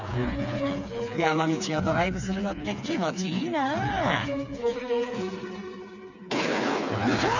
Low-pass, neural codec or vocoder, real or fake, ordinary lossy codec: 7.2 kHz; codec, 16 kHz, 4 kbps, FreqCodec, smaller model; fake; none